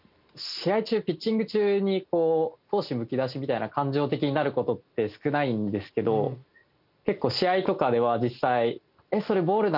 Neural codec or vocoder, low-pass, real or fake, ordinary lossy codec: none; 5.4 kHz; real; none